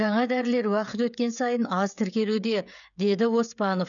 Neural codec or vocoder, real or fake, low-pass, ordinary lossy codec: codec, 16 kHz, 16 kbps, FreqCodec, smaller model; fake; 7.2 kHz; none